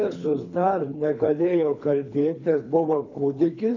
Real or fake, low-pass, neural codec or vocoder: fake; 7.2 kHz; codec, 24 kHz, 3 kbps, HILCodec